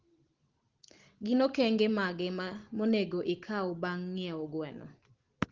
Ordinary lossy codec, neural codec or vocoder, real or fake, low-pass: Opus, 16 kbps; none; real; 7.2 kHz